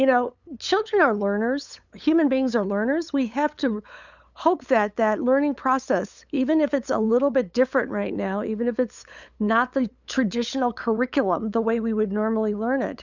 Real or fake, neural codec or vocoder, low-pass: fake; codec, 16 kHz, 8 kbps, FunCodec, trained on LibriTTS, 25 frames a second; 7.2 kHz